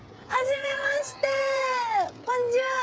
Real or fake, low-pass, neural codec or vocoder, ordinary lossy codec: fake; none; codec, 16 kHz, 16 kbps, FreqCodec, smaller model; none